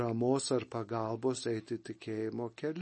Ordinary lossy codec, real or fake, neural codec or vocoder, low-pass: MP3, 32 kbps; real; none; 10.8 kHz